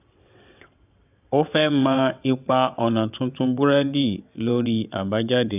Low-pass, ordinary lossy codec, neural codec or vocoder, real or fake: 3.6 kHz; none; vocoder, 22.05 kHz, 80 mel bands, WaveNeXt; fake